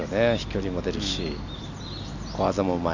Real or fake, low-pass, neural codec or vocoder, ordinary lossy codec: real; 7.2 kHz; none; none